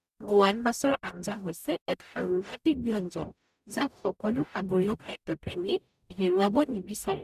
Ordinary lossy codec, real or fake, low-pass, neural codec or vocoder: none; fake; 14.4 kHz; codec, 44.1 kHz, 0.9 kbps, DAC